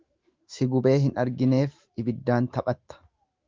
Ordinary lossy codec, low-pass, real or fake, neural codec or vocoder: Opus, 24 kbps; 7.2 kHz; fake; autoencoder, 48 kHz, 128 numbers a frame, DAC-VAE, trained on Japanese speech